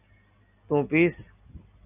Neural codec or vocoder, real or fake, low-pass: none; real; 3.6 kHz